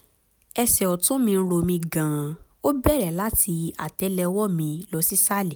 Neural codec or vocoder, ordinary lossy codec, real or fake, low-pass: none; none; real; none